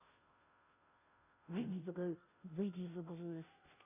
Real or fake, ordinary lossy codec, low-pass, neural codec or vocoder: fake; none; 3.6 kHz; codec, 16 kHz, 0.5 kbps, FunCodec, trained on Chinese and English, 25 frames a second